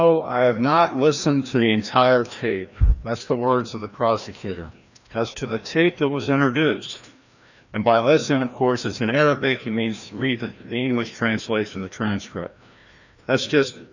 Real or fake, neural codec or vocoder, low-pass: fake; codec, 16 kHz, 1 kbps, FreqCodec, larger model; 7.2 kHz